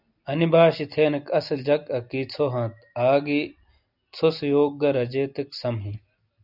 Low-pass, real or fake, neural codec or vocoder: 5.4 kHz; real; none